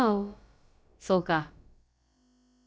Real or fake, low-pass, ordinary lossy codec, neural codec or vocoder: fake; none; none; codec, 16 kHz, about 1 kbps, DyCAST, with the encoder's durations